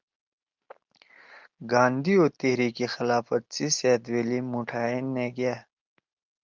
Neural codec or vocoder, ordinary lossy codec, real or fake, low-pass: none; Opus, 24 kbps; real; 7.2 kHz